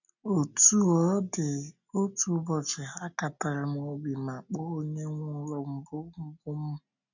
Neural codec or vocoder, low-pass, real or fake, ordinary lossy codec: none; 7.2 kHz; real; none